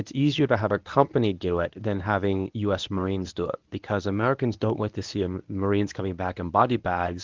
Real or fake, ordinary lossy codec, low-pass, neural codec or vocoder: fake; Opus, 24 kbps; 7.2 kHz; codec, 24 kHz, 0.9 kbps, WavTokenizer, medium speech release version 1